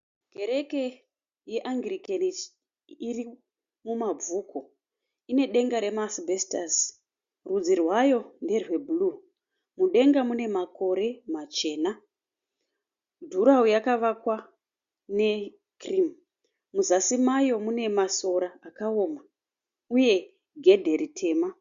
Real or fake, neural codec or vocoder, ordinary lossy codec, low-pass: real; none; Opus, 64 kbps; 7.2 kHz